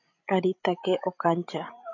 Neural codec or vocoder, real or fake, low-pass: codec, 16 kHz, 16 kbps, FreqCodec, larger model; fake; 7.2 kHz